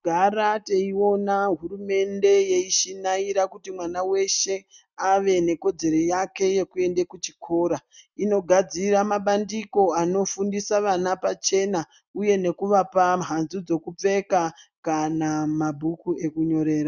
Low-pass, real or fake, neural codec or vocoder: 7.2 kHz; real; none